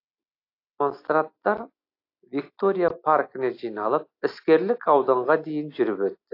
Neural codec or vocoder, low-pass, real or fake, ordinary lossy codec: none; 5.4 kHz; real; AAC, 32 kbps